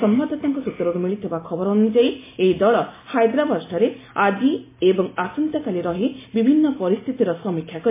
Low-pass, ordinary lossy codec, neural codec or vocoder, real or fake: 3.6 kHz; MP3, 16 kbps; none; real